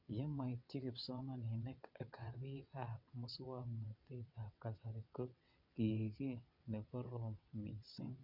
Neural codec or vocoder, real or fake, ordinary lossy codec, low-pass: vocoder, 22.05 kHz, 80 mel bands, WaveNeXt; fake; MP3, 48 kbps; 5.4 kHz